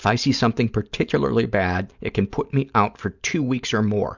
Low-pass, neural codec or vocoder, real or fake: 7.2 kHz; none; real